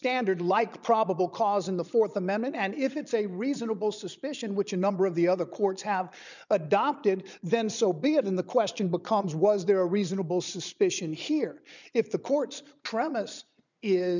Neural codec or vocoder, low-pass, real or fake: vocoder, 22.05 kHz, 80 mel bands, Vocos; 7.2 kHz; fake